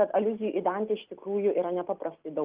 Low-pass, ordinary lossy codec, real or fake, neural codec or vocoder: 3.6 kHz; Opus, 32 kbps; real; none